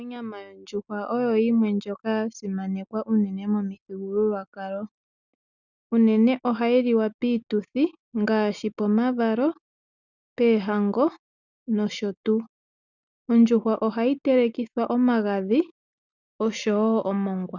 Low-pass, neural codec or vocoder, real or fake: 7.2 kHz; none; real